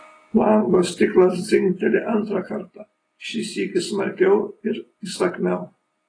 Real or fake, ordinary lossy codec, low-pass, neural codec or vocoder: real; AAC, 32 kbps; 9.9 kHz; none